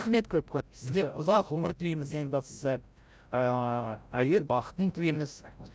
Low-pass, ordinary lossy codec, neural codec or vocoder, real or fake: none; none; codec, 16 kHz, 0.5 kbps, FreqCodec, larger model; fake